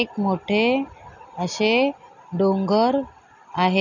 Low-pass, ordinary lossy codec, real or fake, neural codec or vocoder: 7.2 kHz; none; real; none